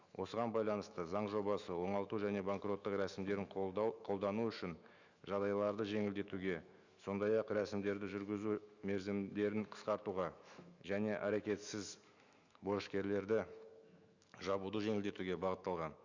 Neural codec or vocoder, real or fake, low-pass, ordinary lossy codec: autoencoder, 48 kHz, 128 numbers a frame, DAC-VAE, trained on Japanese speech; fake; 7.2 kHz; Opus, 64 kbps